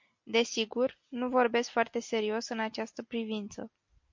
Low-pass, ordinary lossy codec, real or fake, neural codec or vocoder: 7.2 kHz; MP3, 48 kbps; real; none